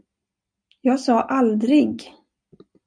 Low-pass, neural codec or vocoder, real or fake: 9.9 kHz; none; real